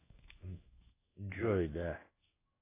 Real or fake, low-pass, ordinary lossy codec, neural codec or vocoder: fake; 3.6 kHz; AAC, 16 kbps; codec, 16 kHz in and 24 kHz out, 1 kbps, XY-Tokenizer